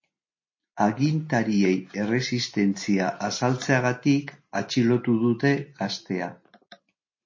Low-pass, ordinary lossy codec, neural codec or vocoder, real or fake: 7.2 kHz; MP3, 32 kbps; none; real